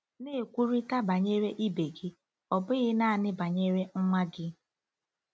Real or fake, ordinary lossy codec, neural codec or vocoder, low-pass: real; none; none; none